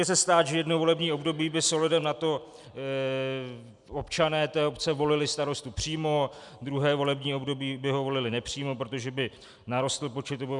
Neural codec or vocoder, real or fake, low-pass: none; real; 10.8 kHz